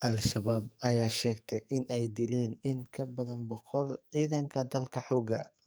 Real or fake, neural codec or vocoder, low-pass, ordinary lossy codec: fake; codec, 44.1 kHz, 2.6 kbps, SNAC; none; none